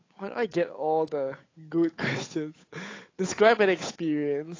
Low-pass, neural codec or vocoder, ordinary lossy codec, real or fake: 7.2 kHz; codec, 16 kHz, 8 kbps, FunCodec, trained on Chinese and English, 25 frames a second; AAC, 32 kbps; fake